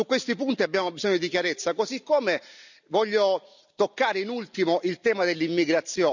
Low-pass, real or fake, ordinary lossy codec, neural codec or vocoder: 7.2 kHz; real; none; none